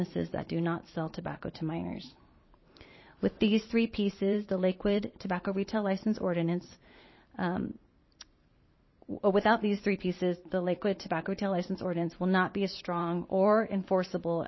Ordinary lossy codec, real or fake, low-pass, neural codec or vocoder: MP3, 24 kbps; fake; 7.2 kHz; vocoder, 44.1 kHz, 128 mel bands every 512 samples, BigVGAN v2